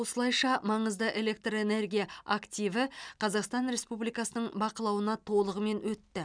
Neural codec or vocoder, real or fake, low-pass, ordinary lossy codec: none; real; 9.9 kHz; none